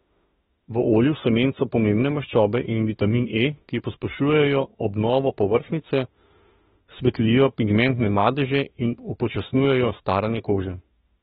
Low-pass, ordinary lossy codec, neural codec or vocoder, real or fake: 19.8 kHz; AAC, 16 kbps; autoencoder, 48 kHz, 32 numbers a frame, DAC-VAE, trained on Japanese speech; fake